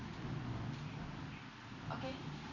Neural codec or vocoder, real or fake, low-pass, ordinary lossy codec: none; real; 7.2 kHz; AAC, 48 kbps